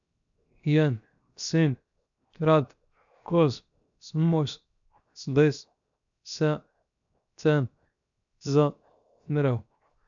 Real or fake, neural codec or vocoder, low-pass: fake; codec, 16 kHz, 0.7 kbps, FocalCodec; 7.2 kHz